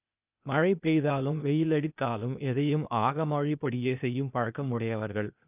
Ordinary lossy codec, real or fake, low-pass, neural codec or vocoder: none; fake; 3.6 kHz; codec, 16 kHz, 0.8 kbps, ZipCodec